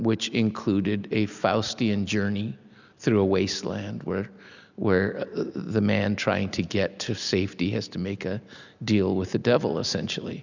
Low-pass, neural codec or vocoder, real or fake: 7.2 kHz; none; real